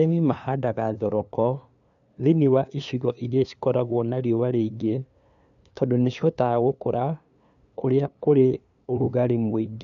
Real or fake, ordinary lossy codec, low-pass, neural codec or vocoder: fake; none; 7.2 kHz; codec, 16 kHz, 2 kbps, FunCodec, trained on LibriTTS, 25 frames a second